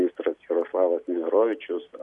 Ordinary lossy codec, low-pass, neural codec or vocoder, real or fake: MP3, 64 kbps; 10.8 kHz; none; real